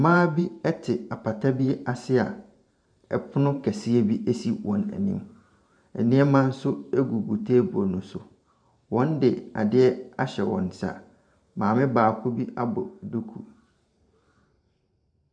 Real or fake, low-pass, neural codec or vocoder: fake; 9.9 kHz; vocoder, 44.1 kHz, 128 mel bands every 512 samples, BigVGAN v2